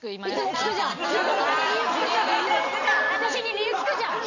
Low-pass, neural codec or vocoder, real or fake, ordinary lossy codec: 7.2 kHz; none; real; none